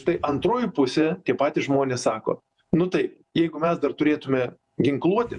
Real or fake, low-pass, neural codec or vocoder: fake; 10.8 kHz; vocoder, 48 kHz, 128 mel bands, Vocos